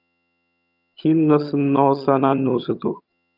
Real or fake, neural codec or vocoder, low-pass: fake; vocoder, 22.05 kHz, 80 mel bands, HiFi-GAN; 5.4 kHz